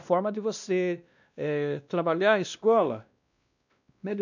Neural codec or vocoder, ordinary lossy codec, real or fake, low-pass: codec, 16 kHz, 1 kbps, X-Codec, WavLM features, trained on Multilingual LibriSpeech; none; fake; 7.2 kHz